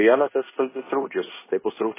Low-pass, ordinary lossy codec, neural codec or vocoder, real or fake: 3.6 kHz; MP3, 16 kbps; codec, 16 kHz in and 24 kHz out, 0.9 kbps, LongCat-Audio-Codec, fine tuned four codebook decoder; fake